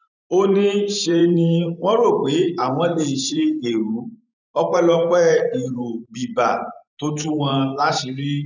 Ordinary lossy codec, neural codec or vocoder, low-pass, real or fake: none; vocoder, 44.1 kHz, 128 mel bands every 512 samples, BigVGAN v2; 7.2 kHz; fake